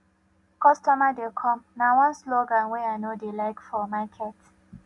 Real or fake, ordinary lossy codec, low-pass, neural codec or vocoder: real; none; 10.8 kHz; none